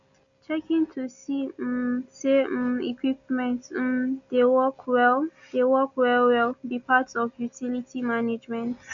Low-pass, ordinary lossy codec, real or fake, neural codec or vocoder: 7.2 kHz; none; real; none